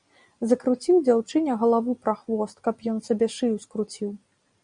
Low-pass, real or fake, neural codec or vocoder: 9.9 kHz; real; none